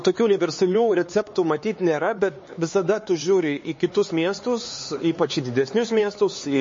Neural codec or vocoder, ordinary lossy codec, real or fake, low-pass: codec, 16 kHz, 4 kbps, X-Codec, HuBERT features, trained on LibriSpeech; MP3, 32 kbps; fake; 7.2 kHz